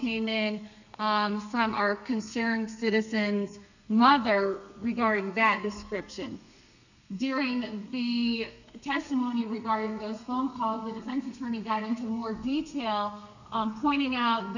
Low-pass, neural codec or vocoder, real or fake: 7.2 kHz; codec, 32 kHz, 1.9 kbps, SNAC; fake